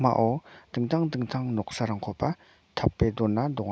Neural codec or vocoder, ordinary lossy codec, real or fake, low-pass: none; none; real; none